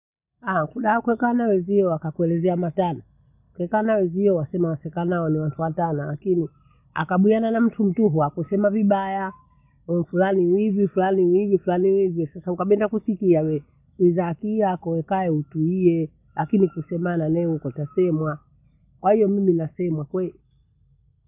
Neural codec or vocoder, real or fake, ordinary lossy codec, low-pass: none; real; none; 3.6 kHz